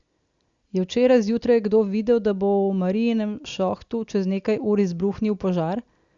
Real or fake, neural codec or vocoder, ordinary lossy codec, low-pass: real; none; Opus, 64 kbps; 7.2 kHz